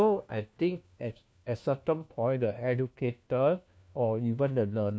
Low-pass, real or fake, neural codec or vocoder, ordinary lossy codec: none; fake; codec, 16 kHz, 1 kbps, FunCodec, trained on LibriTTS, 50 frames a second; none